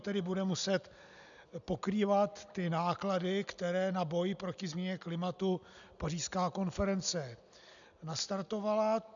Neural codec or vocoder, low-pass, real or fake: none; 7.2 kHz; real